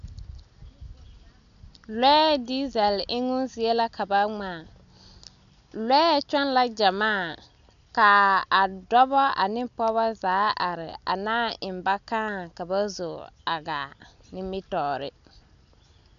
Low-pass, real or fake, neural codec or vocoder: 7.2 kHz; real; none